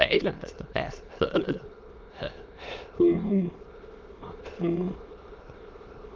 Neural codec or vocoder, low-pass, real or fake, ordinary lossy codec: autoencoder, 22.05 kHz, a latent of 192 numbers a frame, VITS, trained on many speakers; 7.2 kHz; fake; Opus, 24 kbps